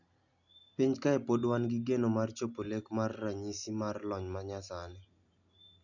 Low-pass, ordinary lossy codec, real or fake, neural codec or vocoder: 7.2 kHz; none; real; none